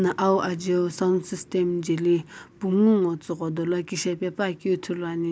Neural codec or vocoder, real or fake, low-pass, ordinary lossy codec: none; real; none; none